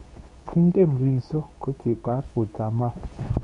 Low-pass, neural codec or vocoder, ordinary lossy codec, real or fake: 10.8 kHz; codec, 24 kHz, 0.9 kbps, WavTokenizer, medium speech release version 2; none; fake